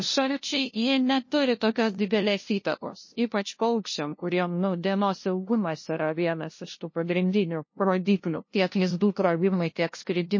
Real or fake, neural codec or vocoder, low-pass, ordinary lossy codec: fake; codec, 16 kHz, 0.5 kbps, FunCodec, trained on LibriTTS, 25 frames a second; 7.2 kHz; MP3, 32 kbps